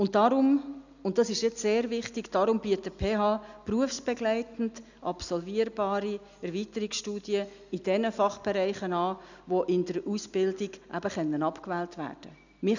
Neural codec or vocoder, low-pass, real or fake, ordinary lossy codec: none; 7.2 kHz; real; none